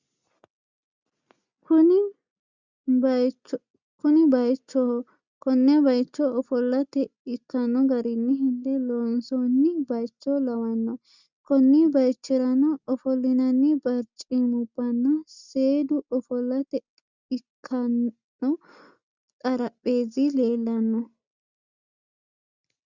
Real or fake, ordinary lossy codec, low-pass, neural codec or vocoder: real; Opus, 64 kbps; 7.2 kHz; none